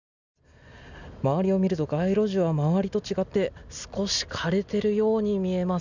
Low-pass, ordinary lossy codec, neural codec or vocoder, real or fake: 7.2 kHz; none; none; real